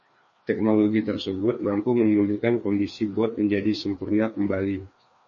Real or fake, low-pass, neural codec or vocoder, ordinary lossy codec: fake; 7.2 kHz; codec, 16 kHz, 2 kbps, FreqCodec, larger model; MP3, 32 kbps